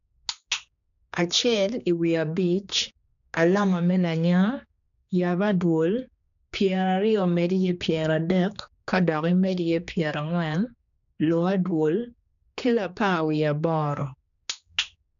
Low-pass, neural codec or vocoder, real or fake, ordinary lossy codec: 7.2 kHz; codec, 16 kHz, 2 kbps, X-Codec, HuBERT features, trained on general audio; fake; none